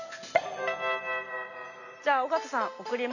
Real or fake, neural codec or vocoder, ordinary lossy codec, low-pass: real; none; none; 7.2 kHz